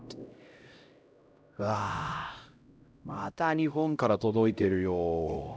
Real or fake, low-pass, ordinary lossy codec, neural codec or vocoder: fake; none; none; codec, 16 kHz, 0.5 kbps, X-Codec, HuBERT features, trained on LibriSpeech